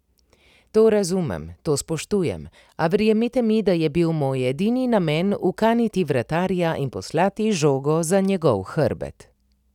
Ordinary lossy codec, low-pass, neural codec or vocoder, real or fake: none; 19.8 kHz; none; real